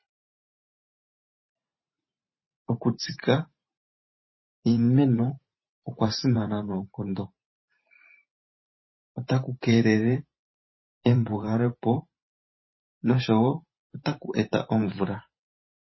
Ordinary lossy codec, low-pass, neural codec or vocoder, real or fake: MP3, 24 kbps; 7.2 kHz; vocoder, 44.1 kHz, 128 mel bands every 512 samples, BigVGAN v2; fake